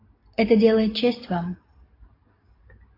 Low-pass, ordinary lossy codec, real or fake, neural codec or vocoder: 5.4 kHz; AAC, 24 kbps; real; none